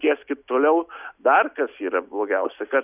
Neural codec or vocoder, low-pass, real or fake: none; 3.6 kHz; real